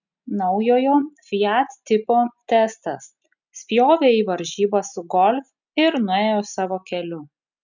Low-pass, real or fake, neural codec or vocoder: 7.2 kHz; real; none